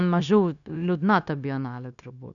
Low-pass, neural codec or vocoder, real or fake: 7.2 kHz; codec, 16 kHz, 0.9 kbps, LongCat-Audio-Codec; fake